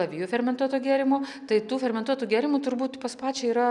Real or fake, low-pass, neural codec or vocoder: real; 10.8 kHz; none